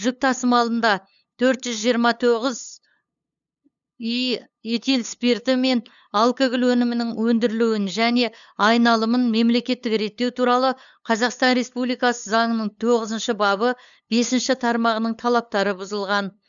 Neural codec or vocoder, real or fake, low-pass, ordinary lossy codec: codec, 16 kHz, 8 kbps, FunCodec, trained on LibriTTS, 25 frames a second; fake; 7.2 kHz; none